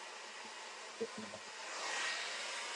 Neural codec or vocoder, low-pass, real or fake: none; 10.8 kHz; real